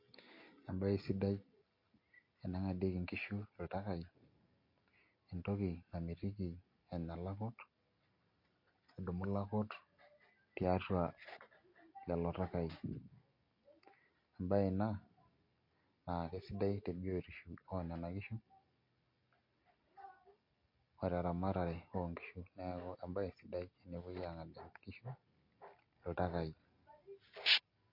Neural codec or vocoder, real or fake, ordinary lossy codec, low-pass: none; real; MP3, 32 kbps; 5.4 kHz